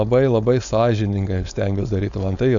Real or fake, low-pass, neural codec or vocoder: fake; 7.2 kHz; codec, 16 kHz, 4.8 kbps, FACodec